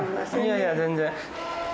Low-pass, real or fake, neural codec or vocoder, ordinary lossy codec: none; real; none; none